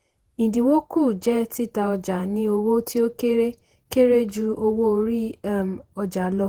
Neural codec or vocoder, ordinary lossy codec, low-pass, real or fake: vocoder, 48 kHz, 128 mel bands, Vocos; Opus, 16 kbps; 19.8 kHz; fake